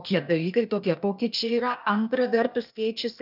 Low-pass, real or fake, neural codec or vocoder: 5.4 kHz; fake; codec, 16 kHz, 0.8 kbps, ZipCodec